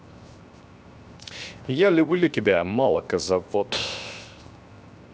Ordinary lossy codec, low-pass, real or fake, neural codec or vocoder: none; none; fake; codec, 16 kHz, 0.7 kbps, FocalCodec